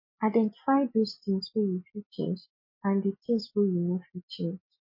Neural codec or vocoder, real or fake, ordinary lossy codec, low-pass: none; real; MP3, 24 kbps; 5.4 kHz